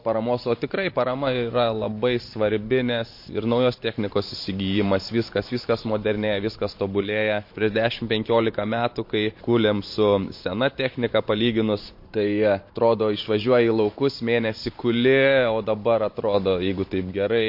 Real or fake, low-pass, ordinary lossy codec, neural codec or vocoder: fake; 5.4 kHz; MP3, 32 kbps; vocoder, 44.1 kHz, 128 mel bands every 512 samples, BigVGAN v2